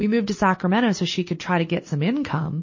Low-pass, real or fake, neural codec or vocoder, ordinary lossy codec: 7.2 kHz; real; none; MP3, 32 kbps